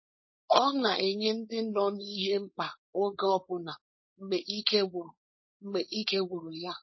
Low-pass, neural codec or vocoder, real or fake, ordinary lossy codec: 7.2 kHz; codec, 16 kHz, 4.8 kbps, FACodec; fake; MP3, 24 kbps